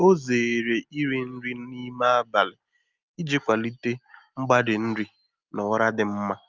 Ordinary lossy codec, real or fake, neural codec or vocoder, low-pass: Opus, 24 kbps; real; none; 7.2 kHz